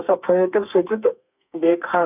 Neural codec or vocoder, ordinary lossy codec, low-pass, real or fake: codec, 32 kHz, 1.9 kbps, SNAC; none; 3.6 kHz; fake